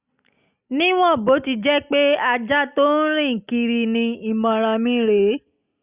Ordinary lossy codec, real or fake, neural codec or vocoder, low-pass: Opus, 64 kbps; real; none; 3.6 kHz